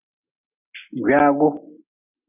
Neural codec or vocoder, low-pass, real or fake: none; 3.6 kHz; real